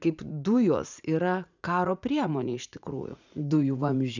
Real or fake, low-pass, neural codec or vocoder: fake; 7.2 kHz; vocoder, 44.1 kHz, 80 mel bands, Vocos